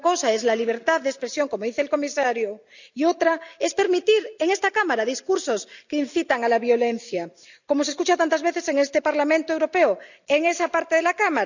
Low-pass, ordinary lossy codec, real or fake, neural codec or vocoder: 7.2 kHz; none; real; none